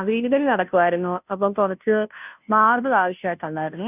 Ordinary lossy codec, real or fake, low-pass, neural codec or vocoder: none; fake; 3.6 kHz; codec, 16 kHz, 0.5 kbps, FunCodec, trained on Chinese and English, 25 frames a second